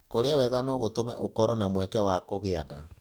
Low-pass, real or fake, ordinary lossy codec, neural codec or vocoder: none; fake; none; codec, 44.1 kHz, 2.6 kbps, DAC